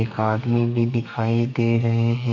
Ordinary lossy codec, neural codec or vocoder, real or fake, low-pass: none; codec, 32 kHz, 1.9 kbps, SNAC; fake; 7.2 kHz